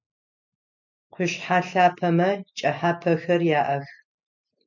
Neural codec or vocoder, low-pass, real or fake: none; 7.2 kHz; real